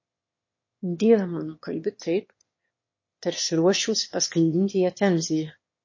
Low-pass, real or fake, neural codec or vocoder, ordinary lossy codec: 7.2 kHz; fake; autoencoder, 22.05 kHz, a latent of 192 numbers a frame, VITS, trained on one speaker; MP3, 32 kbps